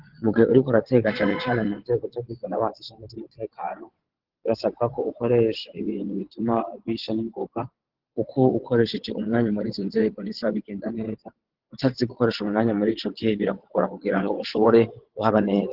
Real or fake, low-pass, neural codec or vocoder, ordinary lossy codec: fake; 5.4 kHz; vocoder, 22.05 kHz, 80 mel bands, WaveNeXt; Opus, 16 kbps